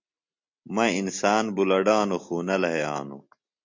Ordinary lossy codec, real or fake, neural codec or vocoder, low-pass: MP3, 48 kbps; real; none; 7.2 kHz